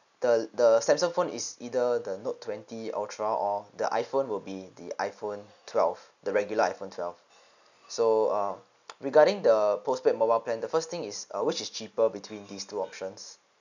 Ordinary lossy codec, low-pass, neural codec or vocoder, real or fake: none; 7.2 kHz; none; real